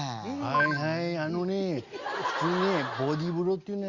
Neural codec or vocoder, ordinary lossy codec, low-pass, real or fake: none; Opus, 64 kbps; 7.2 kHz; real